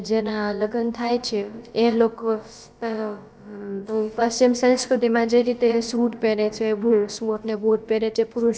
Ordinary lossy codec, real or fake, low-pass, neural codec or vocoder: none; fake; none; codec, 16 kHz, about 1 kbps, DyCAST, with the encoder's durations